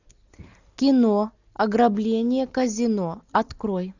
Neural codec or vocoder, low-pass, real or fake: none; 7.2 kHz; real